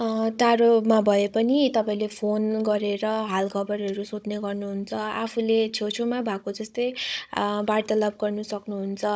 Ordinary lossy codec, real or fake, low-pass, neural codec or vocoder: none; fake; none; codec, 16 kHz, 16 kbps, FunCodec, trained on Chinese and English, 50 frames a second